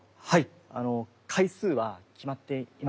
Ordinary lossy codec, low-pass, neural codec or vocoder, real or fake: none; none; none; real